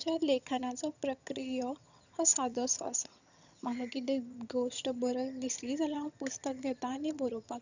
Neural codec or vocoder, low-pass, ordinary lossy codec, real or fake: vocoder, 22.05 kHz, 80 mel bands, HiFi-GAN; 7.2 kHz; none; fake